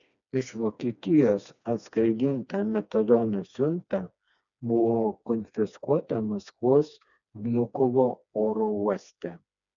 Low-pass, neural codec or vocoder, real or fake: 7.2 kHz; codec, 16 kHz, 2 kbps, FreqCodec, smaller model; fake